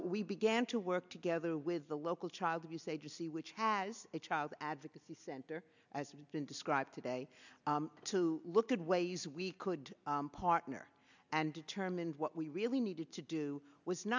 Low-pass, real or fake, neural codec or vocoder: 7.2 kHz; real; none